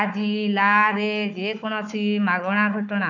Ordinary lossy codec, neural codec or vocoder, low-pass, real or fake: none; codec, 16 kHz, 4 kbps, FunCodec, trained on Chinese and English, 50 frames a second; 7.2 kHz; fake